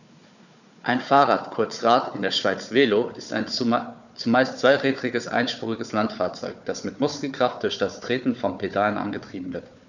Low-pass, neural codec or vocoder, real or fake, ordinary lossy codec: 7.2 kHz; codec, 16 kHz, 4 kbps, FunCodec, trained on Chinese and English, 50 frames a second; fake; none